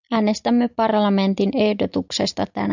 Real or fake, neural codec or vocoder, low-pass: real; none; 7.2 kHz